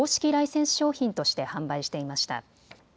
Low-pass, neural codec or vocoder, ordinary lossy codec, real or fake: none; none; none; real